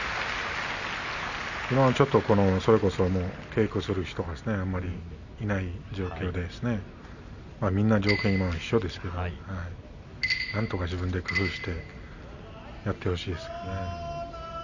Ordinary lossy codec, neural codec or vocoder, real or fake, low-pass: none; none; real; 7.2 kHz